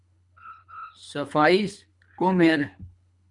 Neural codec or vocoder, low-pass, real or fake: codec, 24 kHz, 3 kbps, HILCodec; 10.8 kHz; fake